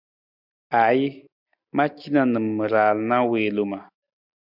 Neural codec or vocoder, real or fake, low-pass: none; real; 5.4 kHz